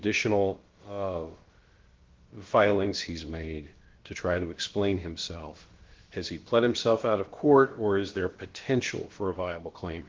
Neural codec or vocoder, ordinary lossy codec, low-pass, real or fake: codec, 16 kHz, about 1 kbps, DyCAST, with the encoder's durations; Opus, 16 kbps; 7.2 kHz; fake